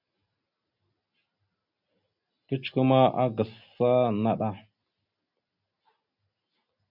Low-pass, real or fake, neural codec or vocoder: 5.4 kHz; real; none